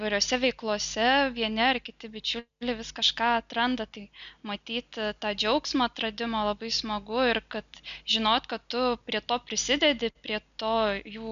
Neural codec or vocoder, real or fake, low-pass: none; real; 7.2 kHz